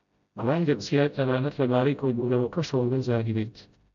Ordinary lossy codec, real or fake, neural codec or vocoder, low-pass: MP3, 64 kbps; fake; codec, 16 kHz, 0.5 kbps, FreqCodec, smaller model; 7.2 kHz